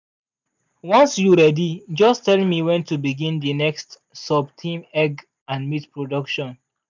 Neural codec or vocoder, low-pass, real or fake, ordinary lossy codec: none; 7.2 kHz; real; none